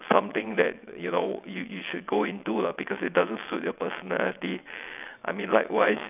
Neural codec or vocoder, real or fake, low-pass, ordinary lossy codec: vocoder, 22.05 kHz, 80 mel bands, WaveNeXt; fake; 3.6 kHz; none